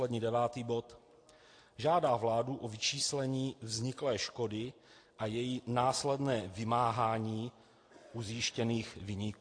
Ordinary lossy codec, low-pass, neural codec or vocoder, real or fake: AAC, 48 kbps; 9.9 kHz; none; real